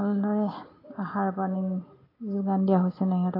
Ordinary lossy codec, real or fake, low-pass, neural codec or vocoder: AAC, 24 kbps; real; 5.4 kHz; none